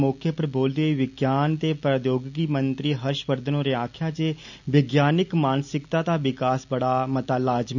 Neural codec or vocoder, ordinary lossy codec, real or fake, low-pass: none; none; real; 7.2 kHz